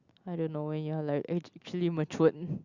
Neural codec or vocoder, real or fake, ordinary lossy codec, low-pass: none; real; Opus, 64 kbps; 7.2 kHz